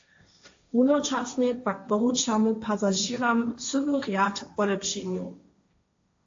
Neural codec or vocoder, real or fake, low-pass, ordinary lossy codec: codec, 16 kHz, 1.1 kbps, Voila-Tokenizer; fake; 7.2 kHz; AAC, 48 kbps